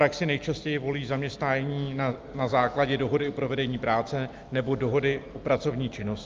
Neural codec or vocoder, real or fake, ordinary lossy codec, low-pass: none; real; Opus, 32 kbps; 7.2 kHz